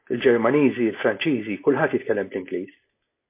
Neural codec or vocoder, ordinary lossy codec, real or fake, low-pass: none; MP3, 24 kbps; real; 3.6 kHz